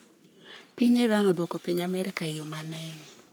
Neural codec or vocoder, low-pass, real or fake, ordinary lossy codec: codec, 44.1 kHz, 3.4 kbps, Pupu-Codec; none; fake; none